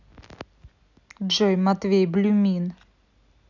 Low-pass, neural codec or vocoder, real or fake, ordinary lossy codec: 7.2 kHz; none; real; none